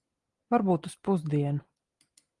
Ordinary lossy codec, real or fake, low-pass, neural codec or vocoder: Opus, 24 kbps; real; 10.8 kHz; none